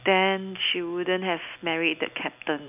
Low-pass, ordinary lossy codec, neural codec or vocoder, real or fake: 3.6 kHz; none; none; real